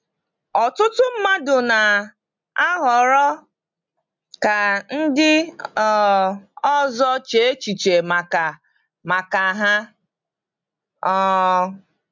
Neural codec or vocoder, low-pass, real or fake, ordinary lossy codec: none; 7.2 kHz; real; MP3, 64 kbps